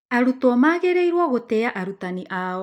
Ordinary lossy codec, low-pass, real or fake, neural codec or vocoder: none; 19.8 kHz; real; none